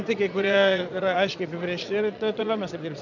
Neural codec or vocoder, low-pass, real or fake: vocoder, 22.05 kHz, 80 mel bands, Vocos; 7.2 kHz; fake